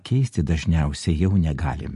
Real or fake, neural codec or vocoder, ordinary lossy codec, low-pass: real; none; MP3, 48 kbps; 14.4 kHz